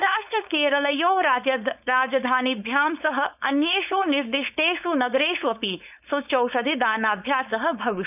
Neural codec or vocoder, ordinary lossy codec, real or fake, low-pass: codec, 16 kHz, 4.8 kbps, FACodec; none; fake; 3.6 kHz